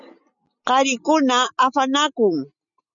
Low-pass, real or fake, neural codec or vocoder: 7.2 kHz; real; none